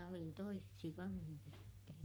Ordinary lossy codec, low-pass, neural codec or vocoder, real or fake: none; none; codec, 44.1 kHz, 3.4 kbps, Pupu-Codec; fake